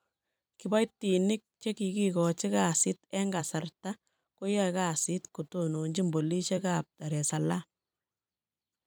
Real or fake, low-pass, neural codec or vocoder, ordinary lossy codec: fake; none; vocoder, 44.1 kHz, 128 mel bands every 256 samples, BigVGAN v2; none